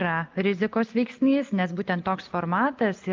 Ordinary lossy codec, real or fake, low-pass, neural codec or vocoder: Opus, 32 kbps; real; 7.2 kHz; none